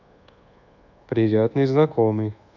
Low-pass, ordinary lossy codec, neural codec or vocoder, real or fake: 7.2 kHz; none; codec, 24 kHz, 1.2 kbps, DualCodec; fake